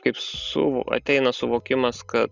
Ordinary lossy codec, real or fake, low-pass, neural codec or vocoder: Opus, 64 kbps; real; 7.2 kHz; none